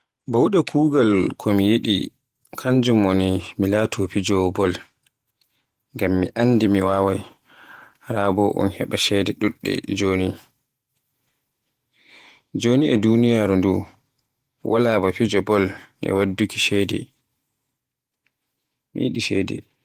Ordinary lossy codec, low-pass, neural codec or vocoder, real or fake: Opus, 24 kbps; 14.4 kHz; vocoder, 48 kHz, 128 mel bands, Vocos; fake